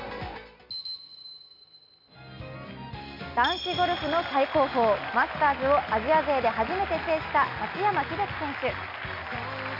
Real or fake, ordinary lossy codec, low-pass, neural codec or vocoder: real; MP3, 48 kbps; 5.4 kHz; none